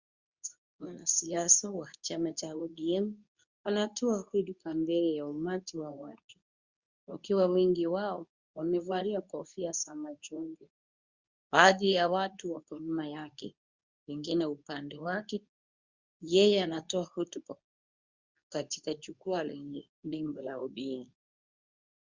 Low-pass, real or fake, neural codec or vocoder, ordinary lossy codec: 7.2 kHz; fake; codec, 24 kHz, 0.9 kbps, WavTokenizer, medium speech release version 2; Opus, 64 kbps